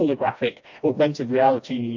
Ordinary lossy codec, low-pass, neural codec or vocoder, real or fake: MP3, 48 kbps; 7.2 kHz; codec, 16 kHz, 1 kbps, FreqCodec, smaller model; fake